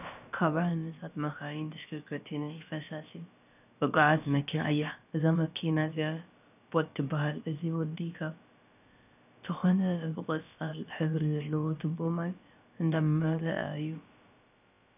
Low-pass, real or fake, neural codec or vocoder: 3.6 kHz; fake; codec, 16 kHz, about 1 kbps, DyCAST, with the encoder's durations